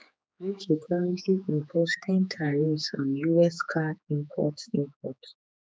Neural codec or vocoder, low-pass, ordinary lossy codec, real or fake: codec, 16 kHz, 4 kbps, X-Codec, HuBERT features, trained on general audio; none; none; fake